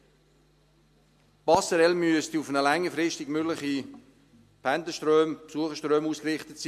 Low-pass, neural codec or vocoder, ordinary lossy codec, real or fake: 14.4 kHz; none; MP3, 64 kbps; real